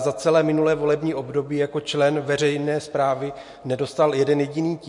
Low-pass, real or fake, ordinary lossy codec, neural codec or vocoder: 10.8 kHz; real; MP3, 48 kbps; none